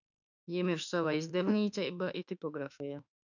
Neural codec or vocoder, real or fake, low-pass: autoencoder, 48 kHz, 32 numbers a frame, DAC-VAE, trained on Japanese speech; fake; 7.2 kHz